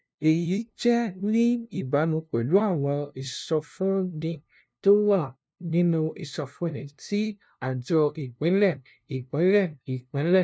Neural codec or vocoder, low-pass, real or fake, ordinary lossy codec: codec, 16 kHz, 0.5 kbps, FunCodec, trained on LibriTTS, 25 frames a second; none; fake; none